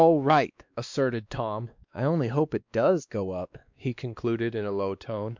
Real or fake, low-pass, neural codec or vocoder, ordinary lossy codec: fake; 7.2 kHz; codec, 16 kHz, 2 kbps, X-Codec, WavLM features, trained on Multilingual LibriSpeech; MP3, 64 kbps